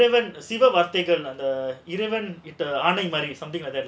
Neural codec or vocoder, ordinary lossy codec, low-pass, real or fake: none; none; none; real